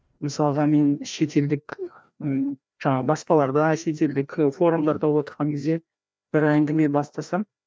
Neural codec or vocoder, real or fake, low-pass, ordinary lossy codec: codec, 16 kHz, 1 kbps, FreqCodec, larger model; fake; none; none